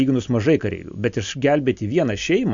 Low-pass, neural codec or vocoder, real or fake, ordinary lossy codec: 7.2 kHz; none; real; MP3, 64 kbps